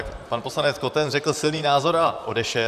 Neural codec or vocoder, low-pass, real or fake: vocoder, 44.1 kHz, 128 mel bands, Pupu-Vocoder; 14.4 kHz; fake